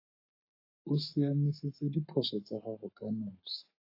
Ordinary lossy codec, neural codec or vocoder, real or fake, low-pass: AAC, 32 kbps; codec, 44.1 kHz, 7.8 kbps, Pupu-Codec; fake; 5.4 kHz